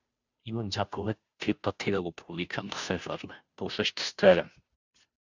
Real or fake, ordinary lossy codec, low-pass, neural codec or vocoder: fake; Opus, 64 kbps; 7.2 kHz; codec, 16 kHz, 0.5 kbps, FunCodec, trained on Chinese and English, 25 frames a second